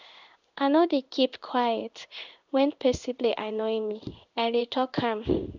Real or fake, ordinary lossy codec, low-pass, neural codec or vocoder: fake; none; 7.2 kHz; codec, 16 kHz in and 24 kHz out, 1 kbps, XY-Tokenizer